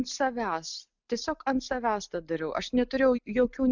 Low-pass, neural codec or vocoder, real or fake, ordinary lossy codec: 7.2 kHz; none; real; Opus, 64 kbps